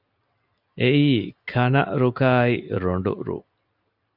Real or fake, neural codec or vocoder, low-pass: real; none; 5.4 kHz